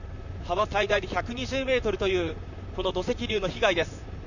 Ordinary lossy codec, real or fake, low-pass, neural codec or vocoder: none; fake; 7.2 kHz; vocoder, 44.1 kHz, 128 mel bands, Pupu-Vocoder